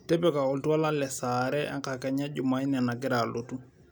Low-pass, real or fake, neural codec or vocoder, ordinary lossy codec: none; real; none; none